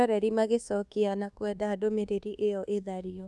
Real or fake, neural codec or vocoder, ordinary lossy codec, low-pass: fake; codec, 24 kHz, 1.2 kbps, DualCodec; none; none